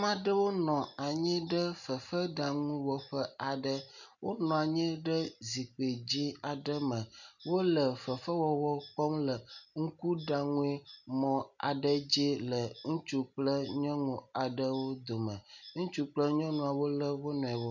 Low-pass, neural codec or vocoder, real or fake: 7.2 kHz; none; real